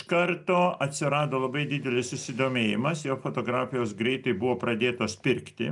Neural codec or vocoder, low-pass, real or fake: none; 10.8 kHz; real